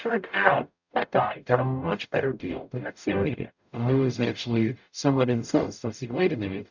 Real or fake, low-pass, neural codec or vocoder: fake; 7.2 kHz; codec, 44.1 kHz, 0.9 kbps, DAC